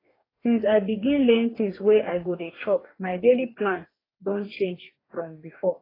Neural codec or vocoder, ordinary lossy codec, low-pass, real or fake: codec, 44.1 kHz, 2.6 kbps, DAC; AAC, 24 kbps; 5.4 kHz; fake